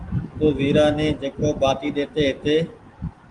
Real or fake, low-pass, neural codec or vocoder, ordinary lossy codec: real; 10.8 kHz; none; Opus, 24 kbps